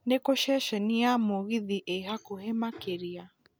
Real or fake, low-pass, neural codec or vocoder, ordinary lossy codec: real; none; none; none